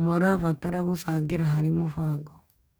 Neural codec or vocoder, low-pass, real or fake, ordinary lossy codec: codec, 44.1 kHz, 2.6 kbps, DAC; none; fake; none